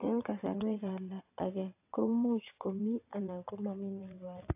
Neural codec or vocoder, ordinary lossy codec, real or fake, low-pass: vocoder, 22.05 kHz, 80 mel bands, WaveNeXt; AAC, 24 kbps; fake; 3.6 kHz